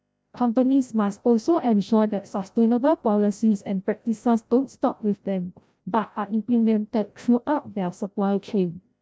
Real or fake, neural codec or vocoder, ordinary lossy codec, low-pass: fake; codec, 16 kHz, 0.5 kbps, FreqCodec, larger model; none; none